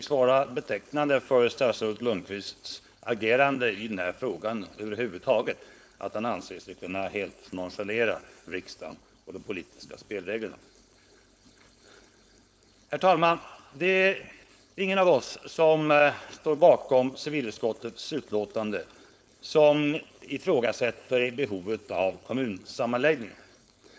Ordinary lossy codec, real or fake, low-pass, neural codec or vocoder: none; fake; none; codec, 16 kHz, 4.8 kbps, FACodec